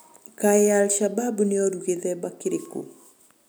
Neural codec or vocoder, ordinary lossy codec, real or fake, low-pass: none; none; real; none